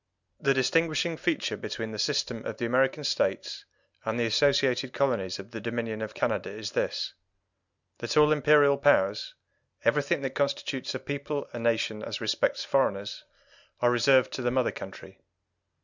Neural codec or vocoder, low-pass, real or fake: none; 7.2 kHz; real